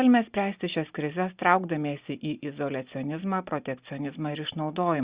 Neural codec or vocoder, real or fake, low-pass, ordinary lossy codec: none; real; 3.6 kHz; Opus, 64 kbps